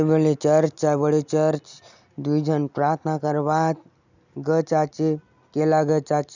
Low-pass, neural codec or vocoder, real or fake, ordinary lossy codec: 7.2 kHz; none; real; none